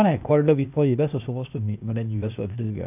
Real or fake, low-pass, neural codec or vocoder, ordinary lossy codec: fake; 3.6 kHz; codec, 16 kHz, 0.8 kbps, ZipCodec; none